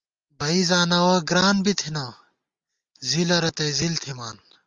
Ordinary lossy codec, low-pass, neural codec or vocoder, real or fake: Opus, 32 kbps; 7.2 kHz; none; real